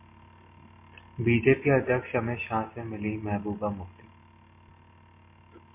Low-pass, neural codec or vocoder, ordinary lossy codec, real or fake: 3.6 kHz; none; MP3, 24 kbps; real